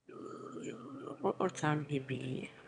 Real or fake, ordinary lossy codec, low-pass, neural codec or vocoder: fake; none; 9.9 kHz; autoencoder, 22.05 kHz, a latent of 192 numbers a frame, VITS, trained on one speaker